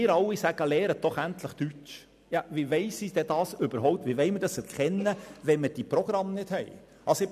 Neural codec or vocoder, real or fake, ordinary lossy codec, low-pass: none; real; none; 14.4 kHz